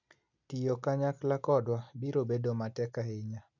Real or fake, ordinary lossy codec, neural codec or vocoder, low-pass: real; none; none; 7.2 kHz